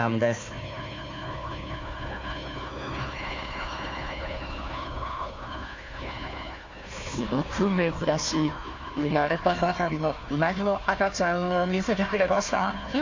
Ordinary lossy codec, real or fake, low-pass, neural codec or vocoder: AAC, 48 kbps; fake; 7.2 kHz; codec, 16 kHz, 1 kbps, FunCodec, trained on Chinese and English, 50 frames a second